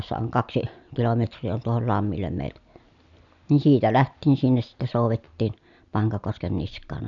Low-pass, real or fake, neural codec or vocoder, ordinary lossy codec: 7.2 kHz; real; none; none